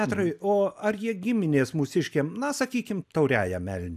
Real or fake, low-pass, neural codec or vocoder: real; 14.4 kHz; none